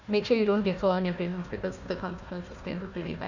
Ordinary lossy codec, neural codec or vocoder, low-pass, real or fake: none; codec, 16 kHz, 1 kbps, FunCodec, trained on Chinese and English, 50 frames a second; 7.2 kHz; fake